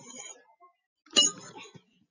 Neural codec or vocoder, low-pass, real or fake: none; 7.2 kHz; real